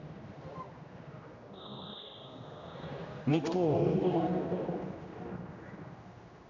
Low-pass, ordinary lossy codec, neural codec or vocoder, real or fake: 7.2 kHz; Opus, 64 kbps; codec, 16 kHz, 1 kbps, X-Codec, HuBERT features, trained on general audio; fake